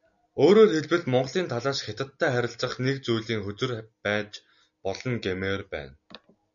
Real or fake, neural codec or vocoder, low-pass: real; none; 7.2 kHz